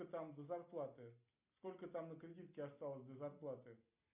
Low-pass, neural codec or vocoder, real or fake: 3.6 kHz; none; real